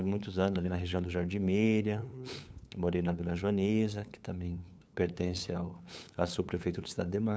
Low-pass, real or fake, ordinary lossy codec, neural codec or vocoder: none; fake; none; codec, 16 kHz, 8 kbps, FunCodec, trained on LibriTTS, 25 frames a second